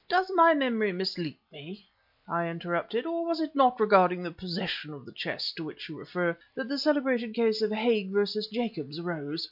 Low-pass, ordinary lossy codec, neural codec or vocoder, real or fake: 5.4 kHz; MP3, 48 kbps; none; real